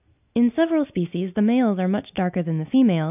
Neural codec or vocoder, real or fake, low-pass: none; real; 3.6 kHz